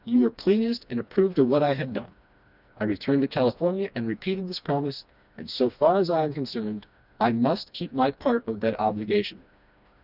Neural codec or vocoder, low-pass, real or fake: codec, 16 kHz, 2 kbps, FreqCodec, smaller model; 5.4 kHz; fake